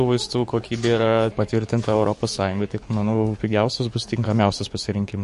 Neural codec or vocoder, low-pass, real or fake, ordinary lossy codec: codec, 44.1 kHz, 7.8 kbps, DAC; 14.4 kHz; fake; MP3, 48 kbps